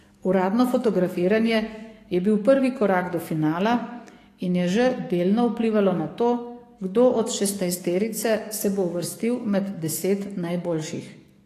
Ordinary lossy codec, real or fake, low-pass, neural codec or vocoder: AAC, 48 kbps; fake; 14.4 kHz; codec, 44.1 kHz, 7.8 kbps, DAC